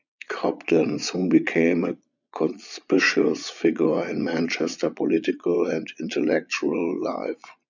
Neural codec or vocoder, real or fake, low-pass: vocoder, 22.05 kHz, 80 mel bands, Vocos; fake; 7.2 kHz